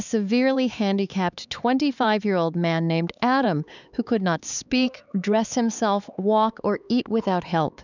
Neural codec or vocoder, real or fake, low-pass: codec, 16 kHz, 4 kbps, X-Codec, HuBERT features, trained on LibriSpeech; fake; 7.2 kHz